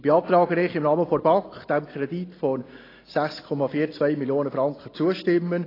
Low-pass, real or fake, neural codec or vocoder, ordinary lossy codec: 5.4 kHz; real; none; AAC, 24 kbps